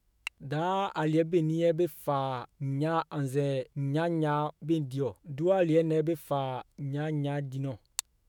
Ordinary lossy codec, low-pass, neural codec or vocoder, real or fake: none; 19.8 kHz; autoencoder, 48 kHz, 128 numbers a frame, DAC-VAE, trained on Japanese speech; fake